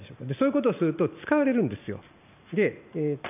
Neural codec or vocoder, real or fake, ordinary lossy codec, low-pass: vocoder, 44.1 kHz, 128 mel bands every 512 samples, BigVGAN v2; fake; none; 3.6 kHz